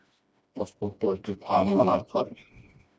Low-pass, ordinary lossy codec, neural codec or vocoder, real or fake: none; none; codec, 16 kHz, 1 kbps, FreqCodec, smaller model; fake